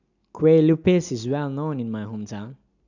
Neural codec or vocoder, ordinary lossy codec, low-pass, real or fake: none; none; 7.2 kHz; real